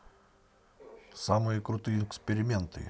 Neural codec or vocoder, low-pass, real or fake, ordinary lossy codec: none; none; real; none